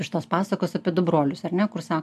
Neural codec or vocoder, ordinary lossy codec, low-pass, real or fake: none; AAC, 96 kbps; 14.4 kHz; real